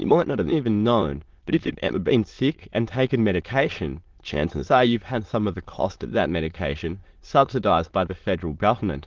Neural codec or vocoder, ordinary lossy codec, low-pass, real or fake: autoencoder, 22.05 kHz, a latent of 192 numbers a frame, VITS, trained on many speakers; Opus, 16 kbps; 7.2 kHz; fake